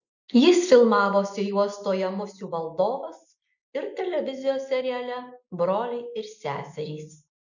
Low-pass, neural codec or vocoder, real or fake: 7.2 kHz; none; real